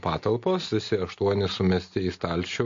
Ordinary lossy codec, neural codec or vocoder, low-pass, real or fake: MP3, 48 kbps; none; 7.2 kHz; real